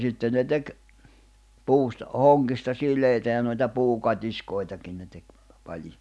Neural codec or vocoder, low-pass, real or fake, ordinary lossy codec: none; none; real; none